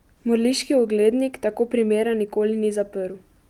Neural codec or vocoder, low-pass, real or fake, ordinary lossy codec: none; 19.8 kHz; real; Opus, 32 kbps